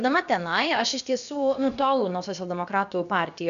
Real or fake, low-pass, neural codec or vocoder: fake; 7.2 kHz; codec, 16 kHz, about 1 kbps, DyCAST, with the encoder's durations